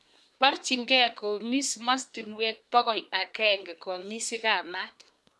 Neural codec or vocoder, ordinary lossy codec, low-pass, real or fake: codec, 24 kHz, 1 kbps, SNAC; none; none; fake